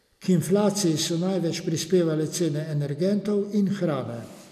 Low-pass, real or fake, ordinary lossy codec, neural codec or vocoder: 14.4 kHz; real; none; none